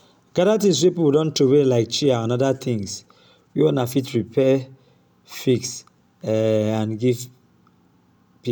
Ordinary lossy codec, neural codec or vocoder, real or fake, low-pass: none; none; real; none